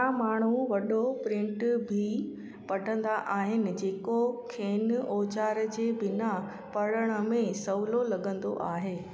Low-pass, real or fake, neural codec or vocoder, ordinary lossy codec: none; real; none; none